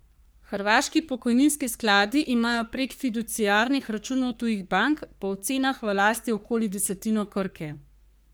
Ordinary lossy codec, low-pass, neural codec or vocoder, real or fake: none; none; codec, 44.1 kHz, 3.4 kbps, Pupu-Codec; fake